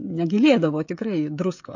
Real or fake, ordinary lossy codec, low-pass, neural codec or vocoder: fake; MP3, 48 kbps; 7.2 kHz; codec, 16 kHz, 16 kbps, FreqCodec, smaller model